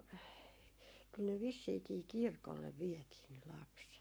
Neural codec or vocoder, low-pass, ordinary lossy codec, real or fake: codec, 44.1 kHz, 7.8 kbps, Pupu-Codec; none; none; fake